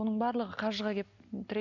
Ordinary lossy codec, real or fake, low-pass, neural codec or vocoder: none; real; 7.2 kHz; none